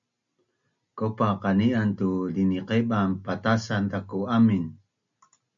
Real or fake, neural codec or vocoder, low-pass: real; none; 7.2 kHz